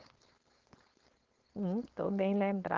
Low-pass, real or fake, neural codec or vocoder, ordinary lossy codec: 7.2 kHz; fake; codec, 16 kHz, 4.8 kbps, FACodec; Opus, 16 kbps